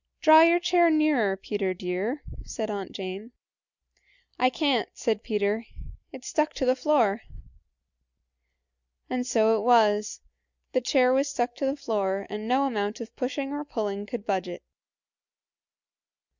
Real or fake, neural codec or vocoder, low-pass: real; none; 7.2 kHz